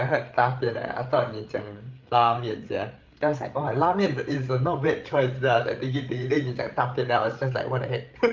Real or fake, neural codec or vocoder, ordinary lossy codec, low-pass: fake; codec, 16 kHz, 8 kbps, FreqCodec, larger model; Opus, 24 kbps; 7.2 kHz